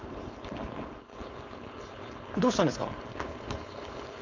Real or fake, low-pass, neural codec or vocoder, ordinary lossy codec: fake; 7.2 kHz; codec, 16 kHz, 4.8 kbps, FACodec; MP3, 64 kbps